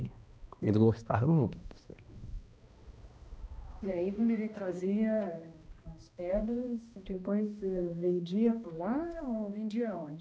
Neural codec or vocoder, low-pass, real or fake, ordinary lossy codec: codec, 16 kHz, 1 kbps, X-Codec, HuBERT features, trained on balanced general audio; none; fake; none